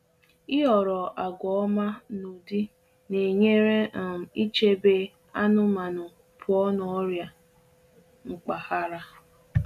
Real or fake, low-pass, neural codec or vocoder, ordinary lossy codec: real; 14.4 kHz; none; none